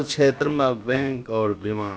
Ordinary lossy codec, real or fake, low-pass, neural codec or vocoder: none; fake; none; codec, 16 kHz, about 1 kbps, DyCAST, with the encoder's durations